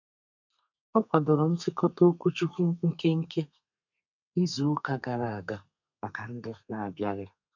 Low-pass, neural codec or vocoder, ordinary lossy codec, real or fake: 7.2 kHz; codec, 32 kHz, 1.9 kbps, SNAC; none; fake